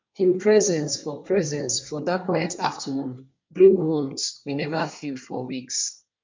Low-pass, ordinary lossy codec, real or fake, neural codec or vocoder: 7.2 kHz; MP3, 64 kbps; fake; codec, 24 kHz, 1 kbps, SNAC